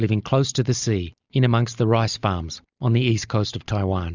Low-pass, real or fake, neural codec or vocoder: 7.2 kHz; real; none